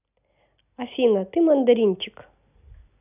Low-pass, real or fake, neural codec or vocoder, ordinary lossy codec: 3.6 kHz; real; none; none